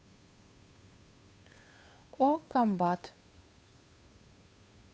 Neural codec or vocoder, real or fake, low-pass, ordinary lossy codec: codec, 16 kHz, 2 kbps, FunCodec, trained on Chinese and English, 25 frames a second; fake; none; none